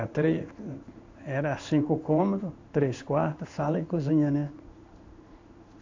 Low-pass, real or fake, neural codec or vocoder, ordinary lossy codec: 7.2 kHz; fake; codec, 16 kHz in and 24 kHz out, 1 kbps, XY-Tokenizer; AAC, 48 kbps